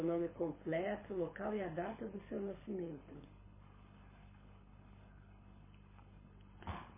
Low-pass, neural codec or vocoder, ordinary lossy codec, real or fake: 3.6 kHz; codec, 24 kHz, 6 kbps, HILCodec; MP3, 16 kbps; fake